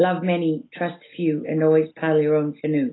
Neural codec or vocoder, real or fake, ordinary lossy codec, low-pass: none; real; AAC, 16 kbps; 7.2 kHz